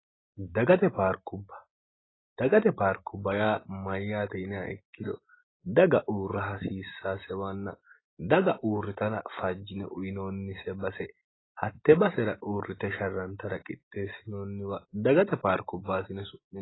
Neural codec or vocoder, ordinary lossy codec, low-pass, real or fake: none; AAC, 16 kbps; 7.2 kHz; real